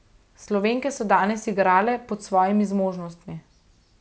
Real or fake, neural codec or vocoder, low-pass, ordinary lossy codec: real; none; none; none